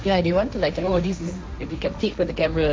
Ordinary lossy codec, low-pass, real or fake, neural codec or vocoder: none; none; fake; codec, 16 kHz, 1.1 kbps, Voila-Tokenizer